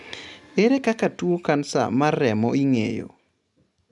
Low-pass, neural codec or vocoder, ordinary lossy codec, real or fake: 10.8 kHz; none; none; real